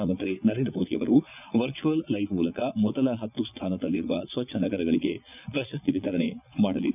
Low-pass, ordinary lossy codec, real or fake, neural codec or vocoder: 3.6 kHz; none; fake; vocoder, 22.05 kHz, 80 mel bands, Vocos